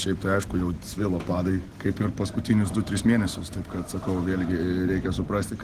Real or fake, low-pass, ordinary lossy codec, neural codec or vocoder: real; 14.4 kHz; Opus, 16 kbps; none